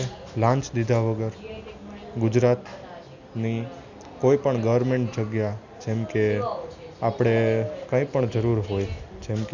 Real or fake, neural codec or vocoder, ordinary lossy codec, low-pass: real; none; none; 7.2 kHz